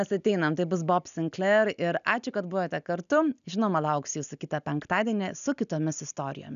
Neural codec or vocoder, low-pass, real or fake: none; 7.2 kHz; real